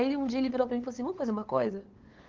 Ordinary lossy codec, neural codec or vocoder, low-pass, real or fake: Opus, 16 kbps; codec, 16 kHz, 8 kbps, FunCodec, trained on LibriTTS, 25 frames a second; 7.2 kHz; fake